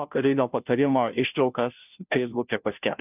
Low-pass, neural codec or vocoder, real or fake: 3.6 kHz; codec, 16 kHz, 0.5 kbps, FunCodec, trained on Chinese and English, 25 frames a second; fake